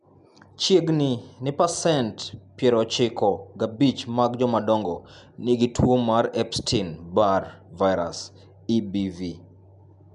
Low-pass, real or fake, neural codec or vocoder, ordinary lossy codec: 10.8 kHz; real; none; MP3, 96 kbps